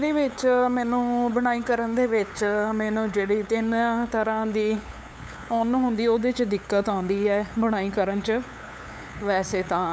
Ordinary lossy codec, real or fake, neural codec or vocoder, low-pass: none; fake; codec, 16 kHz, 8 kbps, FunCodec, trained on LibriTTS, 25 frames a second; none